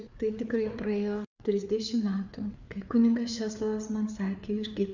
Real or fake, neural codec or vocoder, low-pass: fake; codec, 16 kHz, 4 kbps, FreqCodec, larger model; 7.2 kHz